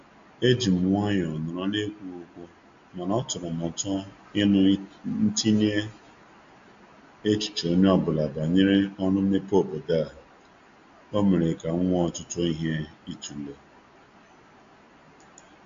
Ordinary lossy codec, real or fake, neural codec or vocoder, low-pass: AAC, 64 kbps; real; none; 7.2 kHz